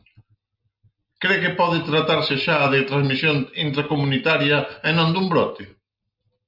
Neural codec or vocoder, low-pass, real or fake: none; 5.4 kHz; real